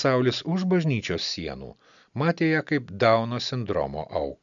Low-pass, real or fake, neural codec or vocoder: 7.2 kHz; real; none